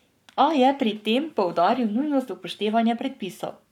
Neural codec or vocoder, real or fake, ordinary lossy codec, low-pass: codec, 44.1 kHz, 7.8 kbps, Pupu-Codec; fake; none; 19.8 kHz